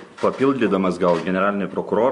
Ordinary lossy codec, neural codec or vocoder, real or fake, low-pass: MP3, 64 kbps; none; real; 10.8 kHz